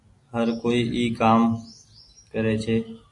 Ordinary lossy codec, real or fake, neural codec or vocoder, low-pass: AAC, 48 kbps; real; none; 10.8 kHz